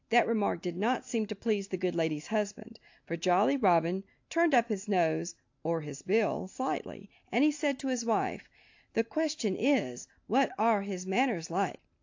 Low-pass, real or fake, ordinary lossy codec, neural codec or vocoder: 7.2 kHz; real; AAC, 48 kbps; none